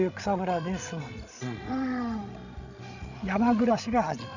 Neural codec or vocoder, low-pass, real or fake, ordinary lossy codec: codec, 16 kHz, 16 kbps, FreqCodec, larger model; 7.2 kHz; fake; none